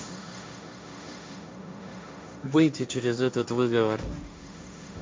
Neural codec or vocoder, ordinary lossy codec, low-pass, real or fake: codec, 16 kHz, 1.1 kbps, Voila-Tokenizer; none; none; fake